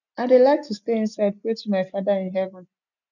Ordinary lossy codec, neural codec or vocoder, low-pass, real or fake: none; none; 7.2 kHz; real